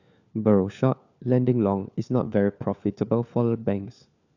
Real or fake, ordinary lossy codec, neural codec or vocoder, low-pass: fake; none; codec, 16 kHz, 4 kbps, FunCodec, trained on LibriTTS, 50 frames a second; 7.2 kHz